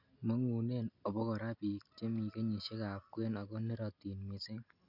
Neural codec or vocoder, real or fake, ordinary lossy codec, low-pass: none; real; none; 5.4 kHz